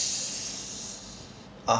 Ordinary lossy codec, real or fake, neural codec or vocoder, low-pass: none; real; none; none